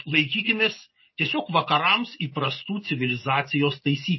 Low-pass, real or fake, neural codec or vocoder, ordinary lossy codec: 7.2 kHz; real; none; MP3, 24 kbps